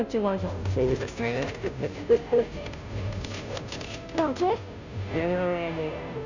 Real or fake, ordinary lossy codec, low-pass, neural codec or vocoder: fake; none; 7.2 kHz; codec, 16 kHz, 0.5 kbps, FunCodec, trained on Chinese and English, 25 frames a second